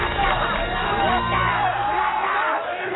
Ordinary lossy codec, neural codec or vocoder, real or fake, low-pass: AAC, 16 kbps; none; real; 7.2 kHz